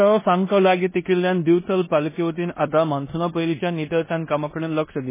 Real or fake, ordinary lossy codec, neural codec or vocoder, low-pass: fake; MP3, 16 kbps; codec, 16 kHz in and 24 kHz out, 0.9 kbps, LongCat-Audio-Codec, four codebook decoder; 3.6 kHz